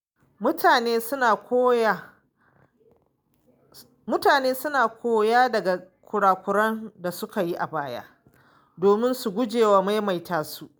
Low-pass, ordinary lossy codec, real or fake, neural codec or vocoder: none; none; real; none